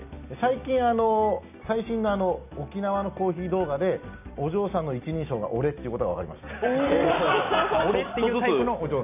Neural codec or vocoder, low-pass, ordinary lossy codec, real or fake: none; 3.6 kHz; none; real